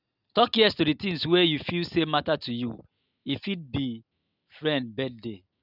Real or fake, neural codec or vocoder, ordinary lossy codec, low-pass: real; none; none; 5.4 kHz